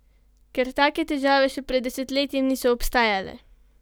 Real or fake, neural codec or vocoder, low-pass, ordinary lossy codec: real; none; none; none